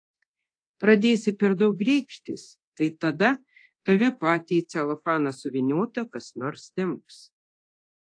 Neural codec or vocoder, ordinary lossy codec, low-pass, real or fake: codec, 24 kHz, 0.9 kbps, DualCodec; AAC, 48 kbps; 9.9 kHz; fake